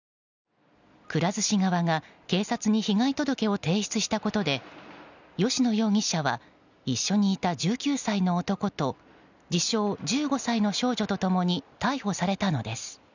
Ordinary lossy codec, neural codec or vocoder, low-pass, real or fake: none; none; 7.2 kHz; real